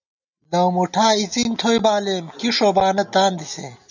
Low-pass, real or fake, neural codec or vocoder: 7.2 kHz; real; none